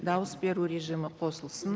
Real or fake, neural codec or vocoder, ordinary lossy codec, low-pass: real; none; none; none